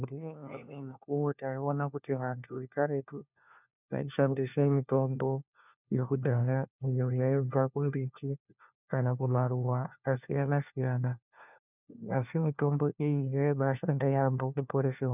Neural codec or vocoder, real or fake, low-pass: codec, 16 kHz, 1 kbps, FunCodec, trained on LibriTTS, 50 frames a second; fake; 3.6 kHz